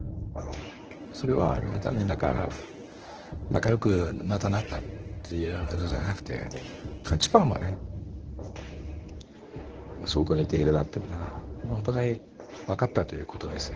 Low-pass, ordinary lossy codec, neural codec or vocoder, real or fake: 7.2 kHz; Opus, 16 kbps; codec, 24 kHz, 0.9 kbps, WavTokenizer, medium speech release version 1; fake